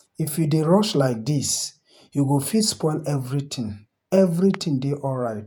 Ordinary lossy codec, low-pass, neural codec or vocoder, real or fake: none; none; vocoder, 48 kHz, 128 mel bands, Vocos; fake